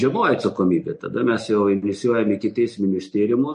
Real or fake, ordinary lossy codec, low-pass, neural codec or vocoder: real; MP3, 48 kbps; 10.8 kHz; none